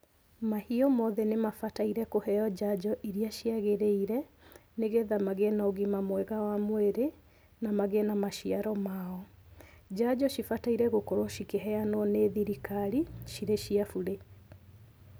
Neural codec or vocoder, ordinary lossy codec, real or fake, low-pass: none; none; real; none